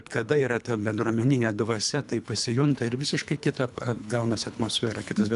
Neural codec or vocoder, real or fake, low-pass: codec, 24 kHz, 3 kbps, HILCodec; fake; 10.8 kHz